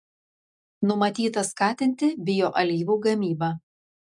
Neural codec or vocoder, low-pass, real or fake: none; 10.8 kHz; real